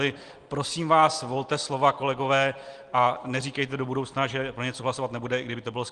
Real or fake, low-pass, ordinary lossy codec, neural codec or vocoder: real; 9.9 kHz; Opus, 24 kbps; none